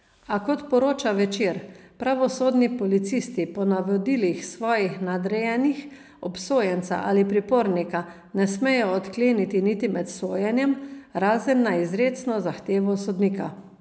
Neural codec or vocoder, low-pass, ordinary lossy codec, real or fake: none; none; none; real